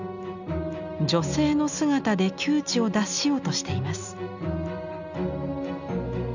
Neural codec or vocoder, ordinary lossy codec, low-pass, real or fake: none; none; 7.2 kHz; real